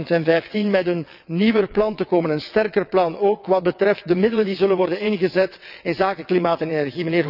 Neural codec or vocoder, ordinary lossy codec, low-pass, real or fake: vocoder, 22.05 kHz, 80 mel bands, WaveNeXt; none; 5.4 kHz; fake